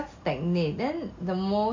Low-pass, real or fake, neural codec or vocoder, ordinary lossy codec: 7.2 kHz; real; none; MP3, 48 kbps